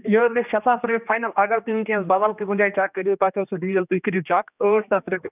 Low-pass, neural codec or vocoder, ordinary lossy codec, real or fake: 3.6 kHz; codec, 16 kHz, 2 kbps, X-Codec, HuBERT features, trained on general audio; none; fake